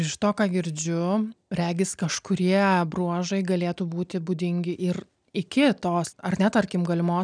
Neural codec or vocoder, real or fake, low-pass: none; real; 9.9 kHz